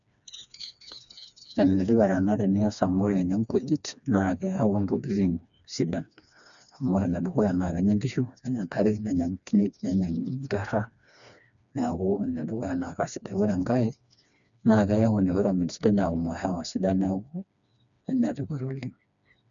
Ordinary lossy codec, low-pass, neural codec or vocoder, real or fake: none; 7.2 kHz; codec, 16 kHz, 2 kbps, FreqCodec, smaller model; fake